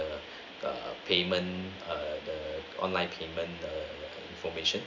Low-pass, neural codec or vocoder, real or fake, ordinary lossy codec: 7.2 kHz; none; real; Opus, 64 kbps